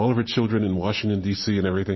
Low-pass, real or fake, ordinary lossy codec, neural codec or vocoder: 7.2 kHz; real; MP3, 24 kbps; none